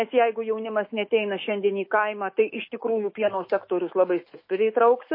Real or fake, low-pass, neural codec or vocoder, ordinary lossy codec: fake; 5.4 kHz; autoencoder, 48 kHz, 128 numbers a frame, DAC-VAE, trained on Japanese speech; MP3, 24 kbps